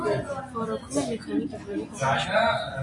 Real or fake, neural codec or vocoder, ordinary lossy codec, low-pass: real; none; AAC, 32 kbps; 10.8 kHz